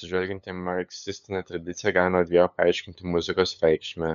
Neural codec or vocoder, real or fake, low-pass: codec, 16 kHz, 8 kbps, FunCodec, trained on LibriTTS, 25 frames a second; fake; 7.2 kHz